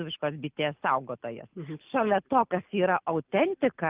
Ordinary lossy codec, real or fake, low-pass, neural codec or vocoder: Opus, 24 kbps; real; 3.6 kHz; none